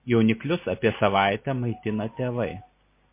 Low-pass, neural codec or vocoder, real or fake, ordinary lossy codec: 3.6 kHz; none; real; MP3, 24 kbps